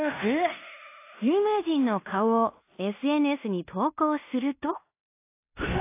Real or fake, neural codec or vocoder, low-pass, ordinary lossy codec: fake; codec, 16 kHz in and 24 kHz out, 0.9 kbps, LongCat-Audio-Codec, fine tuned four codebook decoder; 3.6 kHz; AAC, 24 kbps